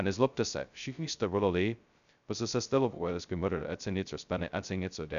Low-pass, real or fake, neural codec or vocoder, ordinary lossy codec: 7.2 kHz; fake; codec, 16 kHz, 0.2 kbps, FocalCodec; MP3, 96 kbps